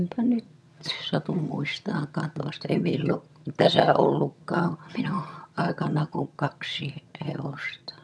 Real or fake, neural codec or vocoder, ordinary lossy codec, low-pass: fake; vocoder, 22.05 kHz, 80 mel bands, HiFi-GAN; none; none